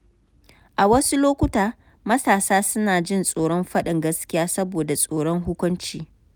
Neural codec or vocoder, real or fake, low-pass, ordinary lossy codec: none; real; none; none